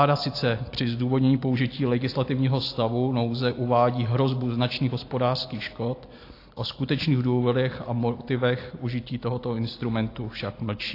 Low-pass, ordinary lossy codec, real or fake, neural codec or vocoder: 5.4 kHz; AAC, 32 kbps; real; none